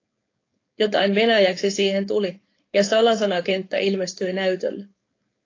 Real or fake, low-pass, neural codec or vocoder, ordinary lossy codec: fake; 7.2 kHz; codec, 16 kHz, 4.8 kbps, FACodec; AAC, 32 kbps